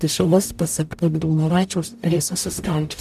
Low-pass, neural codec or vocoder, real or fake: 14.4 kHz; codec, 44.1 kHz, 0.9 kbps, DAC; fake